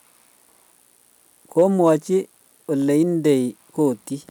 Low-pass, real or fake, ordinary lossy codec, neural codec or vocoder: 19.8 kHz; real; none; none